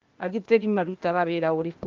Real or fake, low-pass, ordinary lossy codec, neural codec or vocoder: fake; 7.2 kHz; Opus, 24 kbps; codec, 16 kHz, 0.8 kbps, ZipCodec